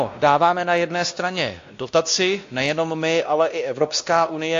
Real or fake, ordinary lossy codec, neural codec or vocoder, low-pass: fake; AAC, 48 kbps; codec, 16 kHz, 1 kbps, X-Codec, WavLM features, trained on Multilingual LibriSpeech; 7.2 kHz